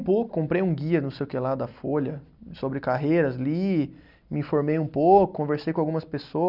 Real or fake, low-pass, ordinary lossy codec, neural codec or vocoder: fake; 5.4 kHz; none; vocoder, 44.1 kHz, 128 mel bands every 256 samples, BigVGAN v2